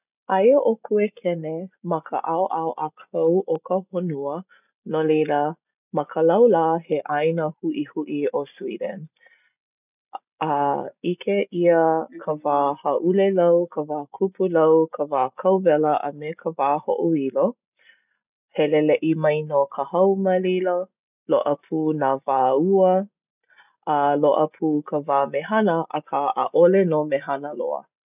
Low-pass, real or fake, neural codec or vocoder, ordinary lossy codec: 3.6 kHz; real; none; none